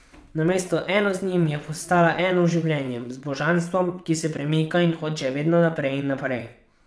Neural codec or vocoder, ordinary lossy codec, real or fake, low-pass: vocoder, 22.05 kHz, 80 mel bands, WaveNeXt; none; fake; none